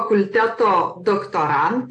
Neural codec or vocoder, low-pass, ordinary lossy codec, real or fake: none; 9.9 kHz; AAC, 64 kbps; real